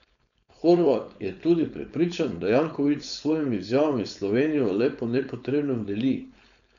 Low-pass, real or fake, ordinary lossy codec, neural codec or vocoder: 7.2 kHz; fake; none; codec, 16 kHz, 4.8 kbps, FACodec